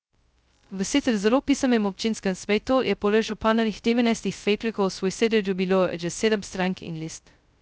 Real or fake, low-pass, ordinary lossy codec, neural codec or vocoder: fake; none; none; codec, 16 kHz, 0.2 kbps, FocalCodec